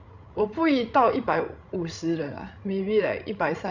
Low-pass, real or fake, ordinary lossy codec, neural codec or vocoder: 7.2 kHz; fake; Opus, 64 kbps; codec, 16 kHz, 16 kbps, FreqCodec, larger model